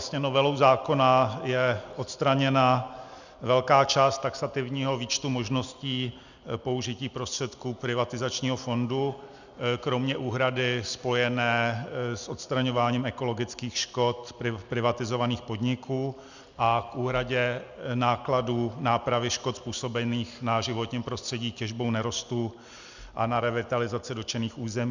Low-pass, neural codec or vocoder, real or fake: 7.2 kHz; none; real